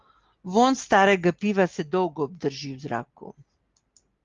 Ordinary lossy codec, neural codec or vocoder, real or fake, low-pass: Opus, 32 kbps; none; real; 7.2 kHz